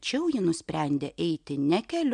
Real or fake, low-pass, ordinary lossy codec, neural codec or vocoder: real; 9.9 kHz; MP3, 64 kbps; none